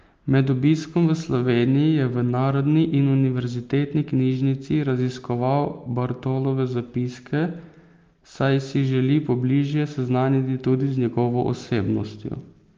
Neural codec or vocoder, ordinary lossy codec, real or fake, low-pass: none; Opus, 24 kbps; real; 7.2 kHz